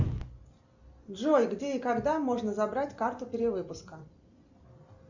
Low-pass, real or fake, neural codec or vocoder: 7.2 kHz; real; none